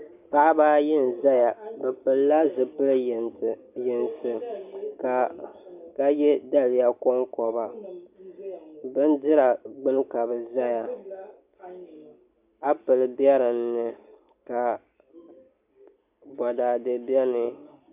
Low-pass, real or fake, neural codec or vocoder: 3.6 kHz; real; none